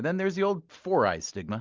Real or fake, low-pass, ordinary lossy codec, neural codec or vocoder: real; 7.2 kHz; Opus, 32 kbps; none